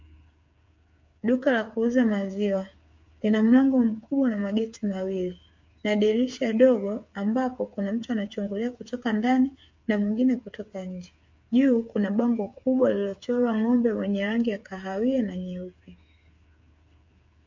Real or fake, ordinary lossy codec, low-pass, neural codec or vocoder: fake; MP3, 48 kbps; 7.2 kHz; codec, 16 kHz, 8 kbps, FreqCodec, smaller model